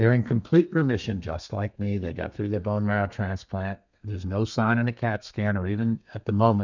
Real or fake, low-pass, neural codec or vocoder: fake; 7.2 kHz; codec, 32 kHz, 1.9 kbps, SNAC